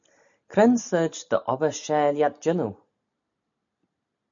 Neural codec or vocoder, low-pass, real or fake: none; 7.2 kHz; real